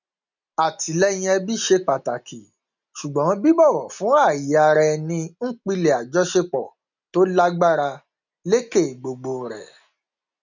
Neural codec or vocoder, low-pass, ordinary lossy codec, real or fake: none; 7.2 kHz; none; real